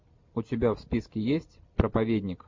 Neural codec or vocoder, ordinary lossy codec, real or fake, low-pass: none; MP3, 48 kbps; real; 7.2 kHz